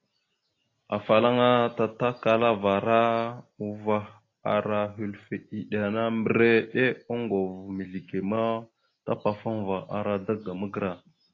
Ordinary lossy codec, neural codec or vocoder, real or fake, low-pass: AAC, 32 kbps; none; real; 7.2 kHz